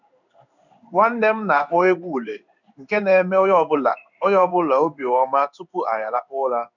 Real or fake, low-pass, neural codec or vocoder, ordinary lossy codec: fake; 7.2 kHz; codec, 16 kHz in and 24 kHz out, 1 kbps, XY-Tokenizer; none